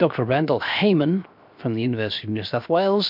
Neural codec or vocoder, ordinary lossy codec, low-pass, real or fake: codec, 16 kHz, 0.7 kbps, FocalCodec; MP3, 48 kbps; 5.4 kHz; fake